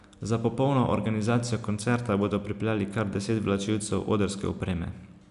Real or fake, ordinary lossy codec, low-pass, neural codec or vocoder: real; none; 10.8 kHz; none